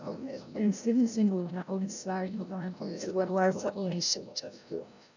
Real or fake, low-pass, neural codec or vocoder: fake; 7.2 kHz; codec, 16 kHz, 0.5 kbps, FreqCodec, larger model